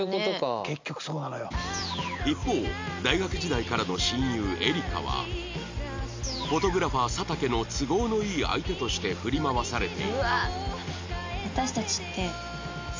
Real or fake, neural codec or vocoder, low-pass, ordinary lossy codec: real; none; 7.2 kHz; MP3, 64 kbps